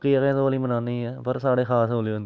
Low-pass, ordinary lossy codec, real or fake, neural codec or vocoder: none; none; real; none